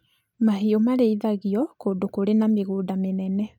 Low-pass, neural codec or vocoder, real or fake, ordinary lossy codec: 19.8 kHz; none; real; none